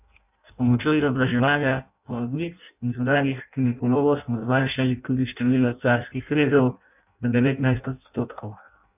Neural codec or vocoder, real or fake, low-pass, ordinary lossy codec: codec, 16 kHz in and 24 kHz out, 0.6 kbps, FireRedTTS-2 codec; fake; 3.6 kHz; none